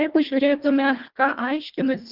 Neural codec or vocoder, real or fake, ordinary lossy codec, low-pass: codec, 24 kHz, 1.5 kbps, HILCodec; fake; Opus, 32 kbps; 5.4 kHz